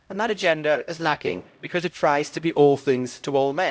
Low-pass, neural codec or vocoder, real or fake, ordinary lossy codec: none; codec, 16 kHz, 0.5 kbps, X-Codec, HuBERT features, trained on LibriSpeech; fake; none